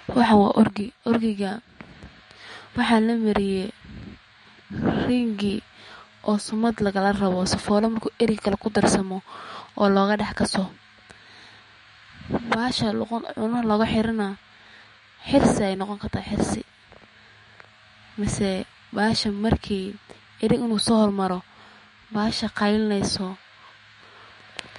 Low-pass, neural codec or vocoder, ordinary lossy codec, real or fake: 19.8 kHz; autoencoder, 48 kHz, 128 numbers a frame, DAC-VAE, trained on Japanese speech; MP3, 48 kbps; fake